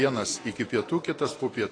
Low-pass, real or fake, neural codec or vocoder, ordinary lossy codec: 9.9 kHz; real; none; AAC, 32 kbps